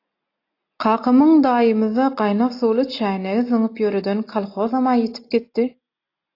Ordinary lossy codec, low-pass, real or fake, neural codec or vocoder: AAC, 32 kbps; 5.4 kHz; real; none